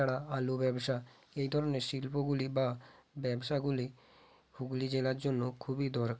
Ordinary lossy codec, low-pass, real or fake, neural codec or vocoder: none; none; real; none